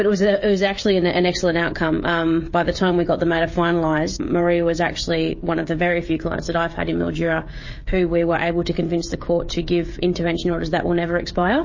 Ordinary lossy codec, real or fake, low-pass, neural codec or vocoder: MP3, 32 kbps; fake; 7.2 kHz; codec, 16 kHz, 16 kbps, FreqCodec, smaller model